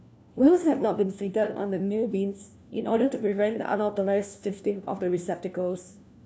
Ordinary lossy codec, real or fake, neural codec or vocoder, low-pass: none; fake; codec, 16 kHz, 1 kbps, FunCodec, trained on LibriTTS, 50 frames a second; none